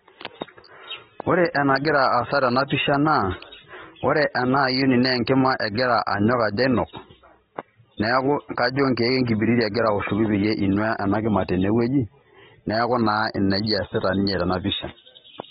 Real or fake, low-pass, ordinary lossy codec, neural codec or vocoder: real; 19.8 kHz; AAC, 16 kbps; none